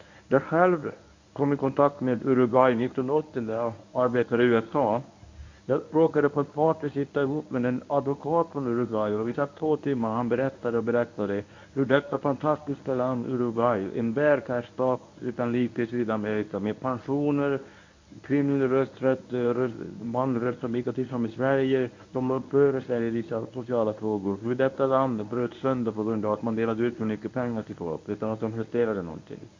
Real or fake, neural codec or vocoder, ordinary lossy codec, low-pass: fake; codec, 24 kHz, 0.9 kbps, WavTokenizer, medium speech release version 1; AAC, 48 kbps; 7.2 kHz